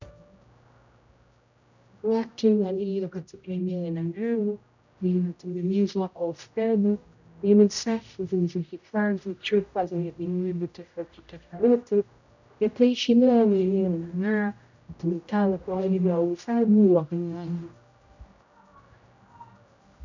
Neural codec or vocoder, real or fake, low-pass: codec, 16 kHz, 0.5 kbps, X-Codec, HuBERT features, trained on general audio; fake; 7.2 kHz